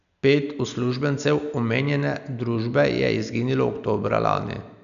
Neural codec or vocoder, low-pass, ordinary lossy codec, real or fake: none; 7.2 kHz; none; real